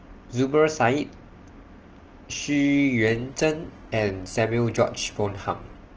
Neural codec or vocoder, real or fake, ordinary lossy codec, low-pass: none; real; Opus, 16 kbps; 7.2 kHz